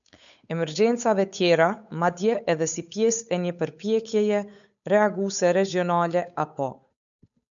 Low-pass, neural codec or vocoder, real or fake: 7.2 kHz; codec, 16 kHz, 8 kbps, FunCodec, trained on Chinese and English, 25 frames a second; fake